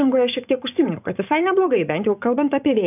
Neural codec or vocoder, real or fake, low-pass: vocoder, 24 kHz, 100 mel bands, Vocos; fake; 3.6 kHz